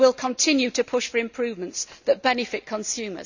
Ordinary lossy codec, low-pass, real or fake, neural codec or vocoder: none; 7.2 kHz; real; none